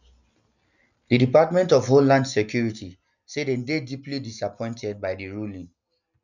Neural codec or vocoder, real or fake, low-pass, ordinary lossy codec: none; real; 7.2 kHz; none